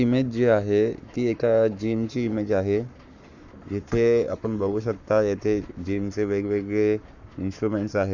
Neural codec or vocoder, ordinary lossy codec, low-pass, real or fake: codec, 44.1 kHz, 7.8 kbps, Pupu-Codec; none; 7.2 kHz; fake